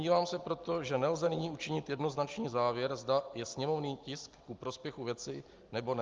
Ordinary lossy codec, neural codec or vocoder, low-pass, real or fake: Opus, 32 kbps; none; 7.2 kHz; real